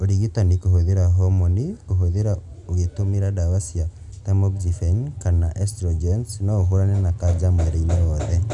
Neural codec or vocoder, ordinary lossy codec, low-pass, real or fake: none; none; 10.8 kHz; real